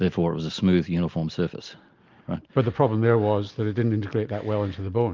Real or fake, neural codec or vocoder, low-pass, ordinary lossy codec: real; none; 7.2 kHz; Opus, 32 kbps